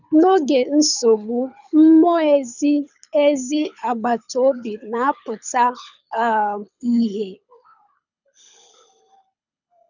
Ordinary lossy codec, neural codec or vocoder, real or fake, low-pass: none; codec, 24 kHz, 6 kbps, HILCodec; fake; 7.2 kHz